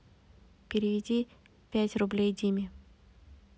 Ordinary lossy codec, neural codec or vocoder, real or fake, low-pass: none; none; real; none